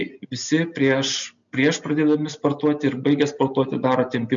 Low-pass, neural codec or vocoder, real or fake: 7.2 kHz; none; real